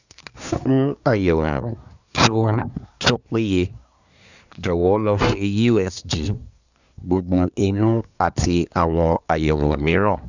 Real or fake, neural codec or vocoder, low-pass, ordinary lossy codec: fake; codec, 24 kHz, 1 kbps, SNAC; 7.2 kHz; none